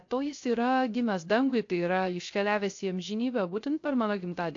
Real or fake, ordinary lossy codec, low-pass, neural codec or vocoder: fake; AAC, 48 kbps; 7.2 kHz; codec, 16 kHz, 0.3 kbps, FocalCodec